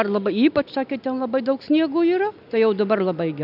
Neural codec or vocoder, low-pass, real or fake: none; 5.4 kHz; real